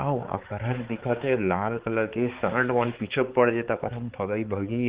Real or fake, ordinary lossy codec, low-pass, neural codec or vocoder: fake; Opus, 16 kbps; 3.6 kHz; codec, 16 kHz, 4 kbps, X-Codec, HuBERT features, trained on balanced general audio